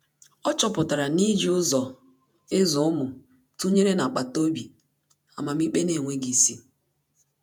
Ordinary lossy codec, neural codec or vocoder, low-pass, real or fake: none; vocoder, 48 kHz, 128 mel bands, Vocos; none; fake